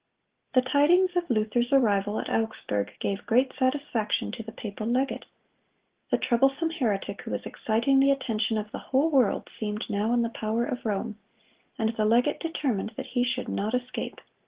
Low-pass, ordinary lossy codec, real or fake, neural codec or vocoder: 3.6 kHz; Opus, 16 kbps; real; none